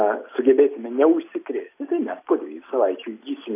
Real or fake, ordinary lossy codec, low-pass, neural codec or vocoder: real; AAC, 24 kbps; 3.6 kHz; none